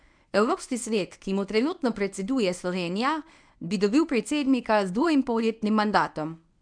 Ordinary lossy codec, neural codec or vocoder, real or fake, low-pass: none; codec, 24 kHz, 0.9 kbps, WavTokenizer, medium speech release version 1; fake; 9.9 kHz